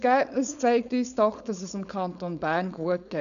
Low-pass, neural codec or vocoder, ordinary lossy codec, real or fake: 7.2 kHz; codec, 16 kHz, 4.8 kbps, FACodec; none; fake